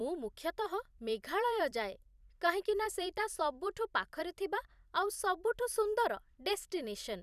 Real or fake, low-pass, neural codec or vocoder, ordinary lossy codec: fake; 14.4 kHz; vocoder, 44.1 kHz, 128 mel bands every 256 samples, BigVGAN v2; none